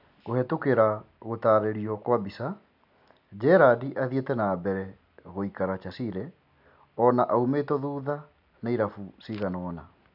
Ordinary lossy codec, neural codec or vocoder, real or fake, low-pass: none; none; real; 5.4 kHz